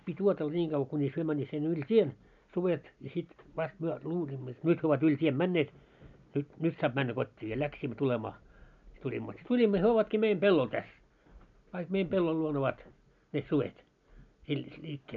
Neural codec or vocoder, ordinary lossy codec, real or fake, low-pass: none; none; real; 7.2 kHz